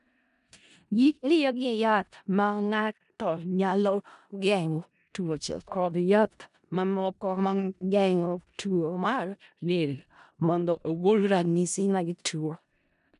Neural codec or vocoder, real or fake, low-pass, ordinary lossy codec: codec, 16 kHz in and 24 kHz out, 0.4 kbps, LongCat-Audio-Codec, four codebook decoder; fake; 10.8 kHz; none